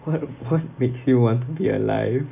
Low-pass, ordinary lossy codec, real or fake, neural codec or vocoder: 3.6 kHz; none; real; none